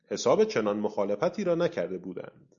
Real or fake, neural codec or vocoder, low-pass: real; none; 7.2 kHz